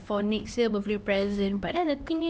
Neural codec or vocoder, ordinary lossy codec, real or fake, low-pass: codec, 16 kHz, 2 kbps, X-Codec, HuBERT features, trained on LibriSpeech; none; fake; none